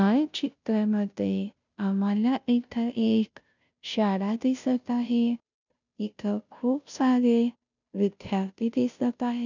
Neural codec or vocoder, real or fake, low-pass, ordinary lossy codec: codec, 16 kHz, 0.5 kbps, FunCodec, trained on Chinese and English, 25 frames a second; fake; 7.2 kHz; none